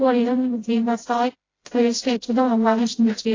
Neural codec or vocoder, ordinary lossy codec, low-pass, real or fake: codec, 16 kHz, 0.5 kbps, FreqCodec, smaller model; AAC, 32 kbps; 7.2 kHz; fake